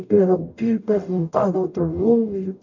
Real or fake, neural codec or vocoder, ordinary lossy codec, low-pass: fake; codec, 44.1 kHz, 0.9 kbps, DAC; none; 7.2 kHz